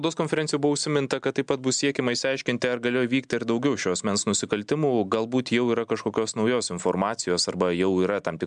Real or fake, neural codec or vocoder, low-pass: real; none; 9.9 kHz